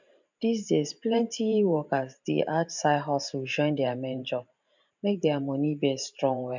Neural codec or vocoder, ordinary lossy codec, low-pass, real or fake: vocoder, 44.1 kHz, 128 mel bands every 512 samples, BigVGAN v2; none; 7.2 kHz; fake